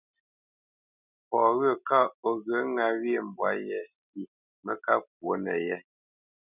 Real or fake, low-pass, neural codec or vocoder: real; 3.6 kHz; none